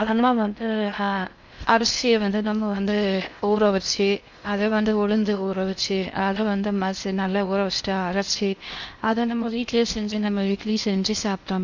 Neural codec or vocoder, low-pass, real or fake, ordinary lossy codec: codec, 16 kHz in and 24 kHz out, 0.6 kbps, FocalCodec, streaming, 4096 codes; 7.2 kHz; fake; none